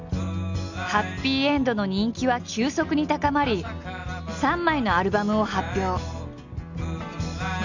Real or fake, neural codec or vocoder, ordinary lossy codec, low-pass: real; none; none; 7.2 kHz